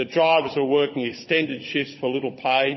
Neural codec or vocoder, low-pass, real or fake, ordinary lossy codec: codec, 16 kHz, 16 kbps, FunCodec, trained on Chinese and English, 50 frames a second; 7.2 kHz; fake; MP3, 24 kbps